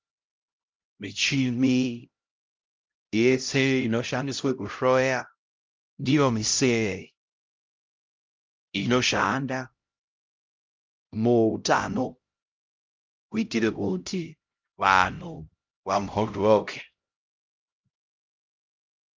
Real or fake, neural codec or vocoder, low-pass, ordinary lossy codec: fake; codec, 16 kHz, 0.5 kbps, X-Codec, HuBERT features, trained on LibriSpeech; 7.2 kHz; Opus, 32 kbps